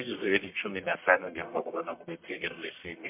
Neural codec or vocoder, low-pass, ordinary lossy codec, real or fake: codec, 44.1 kHz, 1.7 kbps, Pupu-Codec; 3.6 kHz; MP3, 32 kbps; fake